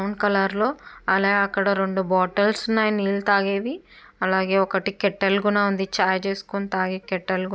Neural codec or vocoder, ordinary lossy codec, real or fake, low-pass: none; none; real; none